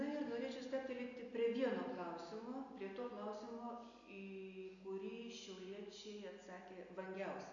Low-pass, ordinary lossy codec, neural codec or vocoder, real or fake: 7.2 kHz; MP3, 96 kbps; none; real